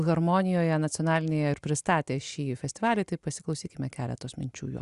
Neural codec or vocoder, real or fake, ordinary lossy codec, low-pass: none; real; Opus, 64 kbps; 10.8 kHz